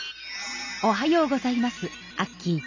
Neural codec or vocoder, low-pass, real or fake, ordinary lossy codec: none; 7.2 kHz; real; none